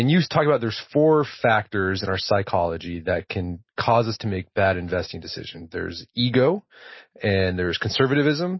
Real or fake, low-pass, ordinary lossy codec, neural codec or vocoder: real; 7.2 kHz; MP3, 24 kbps; none